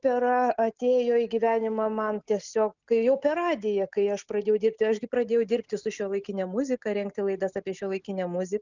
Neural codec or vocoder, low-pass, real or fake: none; 7.2 kHz; real